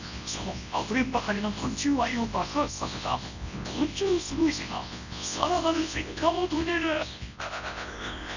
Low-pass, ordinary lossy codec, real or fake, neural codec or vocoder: 7.2 kHz; none; fake; codec, 24 kHz, 0.9 kbps, WavTokenizer, large speech release